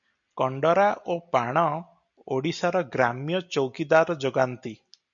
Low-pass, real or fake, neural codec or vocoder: 7.2 kHz; real; none